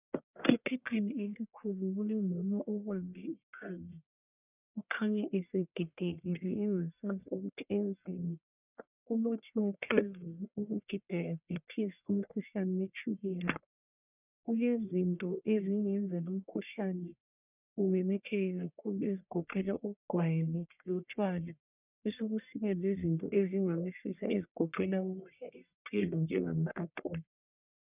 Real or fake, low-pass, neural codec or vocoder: fake; 3.6 kHz; codec, 44.1 kHz, 1.7 kbps, Pupu-Codec